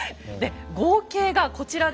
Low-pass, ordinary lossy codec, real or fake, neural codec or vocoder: none; none; real; none